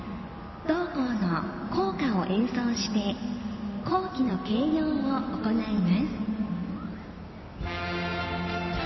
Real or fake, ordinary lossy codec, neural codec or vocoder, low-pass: real; MP3, 24 kbps; none; 7.2 kHz